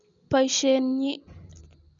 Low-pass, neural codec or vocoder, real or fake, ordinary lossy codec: 7.2 kHz; none; real; none